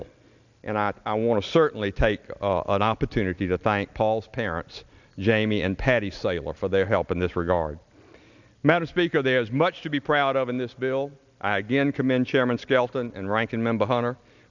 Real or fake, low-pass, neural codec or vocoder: real; 7.2 kHz; none